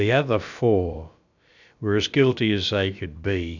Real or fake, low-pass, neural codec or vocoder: fake; 7.2 kHz; codec, 16 kHz, about 1 kbps, DyCAST, with the encoder's durations